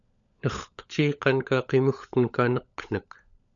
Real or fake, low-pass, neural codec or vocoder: fake; 7.2 kHz; codec, 16 kHz, 16 kbps, FunCodec, trained on LibriTTS, 50 frames a second